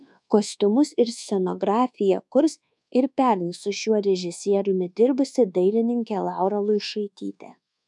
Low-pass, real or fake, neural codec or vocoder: 10.8 kHz; fake; codec, 24 kHz, 1.2 kbps, DualCodec